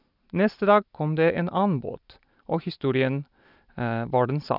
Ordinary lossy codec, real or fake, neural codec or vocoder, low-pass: none; real; none; 5.4 kHz